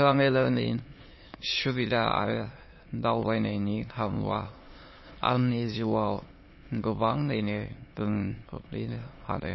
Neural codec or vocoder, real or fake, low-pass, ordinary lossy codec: autoencoder, 22.05 kHz, a latent of 192 numbers a frame, VITS, trained on many speakers; fake; 7.2 kHz; MP3, 24 kbps